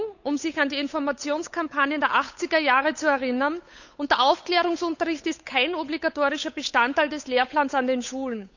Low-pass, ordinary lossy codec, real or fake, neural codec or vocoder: 7.2 kHz; none; fake; codec, 16 kHz, 8 kbps, FunCodec, trained on Chinese and English, 25 frames a second